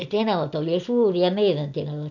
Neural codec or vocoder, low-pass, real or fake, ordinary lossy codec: none; 7.2 kHz; real; none